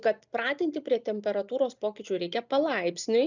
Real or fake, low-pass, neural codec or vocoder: real; 7.2 kHz; none